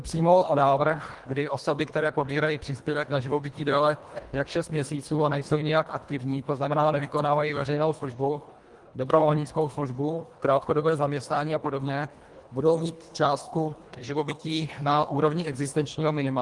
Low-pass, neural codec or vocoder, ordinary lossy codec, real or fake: 10.8 kHz; codec, 24 kHz, 1.5 kbps, HILCodec; Opus, 24 kbps; fake